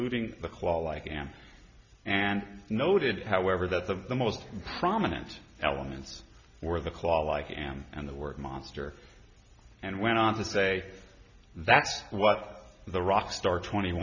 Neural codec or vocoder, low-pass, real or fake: none; 7.2 kHz; real